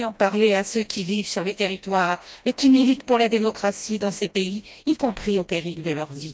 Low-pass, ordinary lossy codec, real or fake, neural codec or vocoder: none; none; fake; codec, 16 kHz, 1 kbps, FreqCodec, smaller model